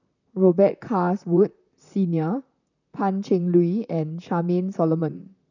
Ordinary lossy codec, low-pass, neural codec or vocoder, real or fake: none; 7.2 kHz; vocoder, 44.1 kHz, 128 mel bands, Pupu-Vocoder; fake